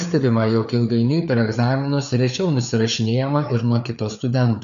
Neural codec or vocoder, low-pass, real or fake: codec, 16 kHz, 4 kbps, FreqCodec, larger model; 7.2 kHz; fake